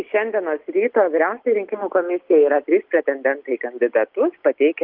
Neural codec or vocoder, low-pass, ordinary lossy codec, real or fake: none; 5.4 kHz; Opus, 32 kbps; real